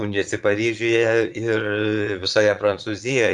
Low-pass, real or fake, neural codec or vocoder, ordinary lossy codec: 9.9 kHz; fake; vocoder, 22.05 kHz, 80 mel bands, Vocos; MP3, 64 kbps